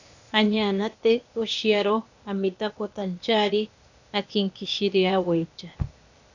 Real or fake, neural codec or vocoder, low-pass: fake; codec, 16 kHz, 0.8 kbps, ZipCodec; 7.2 kHz